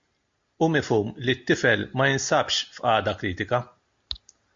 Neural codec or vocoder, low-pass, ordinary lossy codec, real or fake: none; 7.2 kHz; MP3, 48 kbps; real